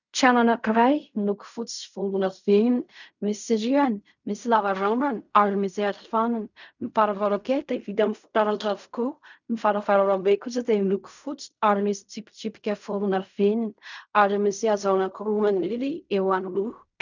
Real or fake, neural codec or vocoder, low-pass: fake; codec, 16 kHz in and 24 kHz out, 0.4 kbps, LongCat-Audio-Codec, fine tuned four codebook decoder; 7.2 kHz